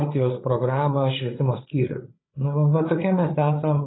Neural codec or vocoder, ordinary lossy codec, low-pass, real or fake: codec, 16 kHz, 8 kbps, FreqCodec, larger model; AAC, 16 kbps; 7.2 kHz; fake